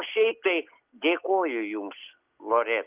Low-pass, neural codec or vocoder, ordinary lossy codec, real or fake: 3.6 kHz; none; Opus, 32 kbps; real